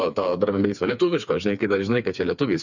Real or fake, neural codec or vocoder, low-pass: fake; codec, 16 kHz, 4 kbps, FreqCodec, smaller model; 7.2 kHz